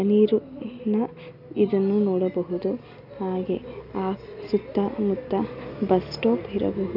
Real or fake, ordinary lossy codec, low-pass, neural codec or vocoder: real; none; 5.4 kHz; none